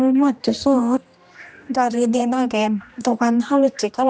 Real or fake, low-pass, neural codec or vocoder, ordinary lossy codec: fake; none; codec, 16 kHz, 1 kbps, X-Codec, HuBERT features, trained on general audio; none